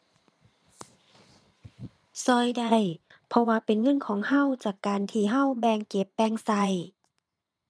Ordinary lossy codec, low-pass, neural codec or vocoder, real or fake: none; none; vocoder, 22.05 kHz, 80 mel bands, Vocos; fake